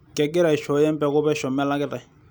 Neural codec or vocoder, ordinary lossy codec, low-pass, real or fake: none; none; none; real